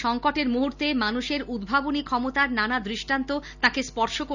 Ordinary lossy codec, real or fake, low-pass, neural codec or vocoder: none; real; 7.2 kHz; none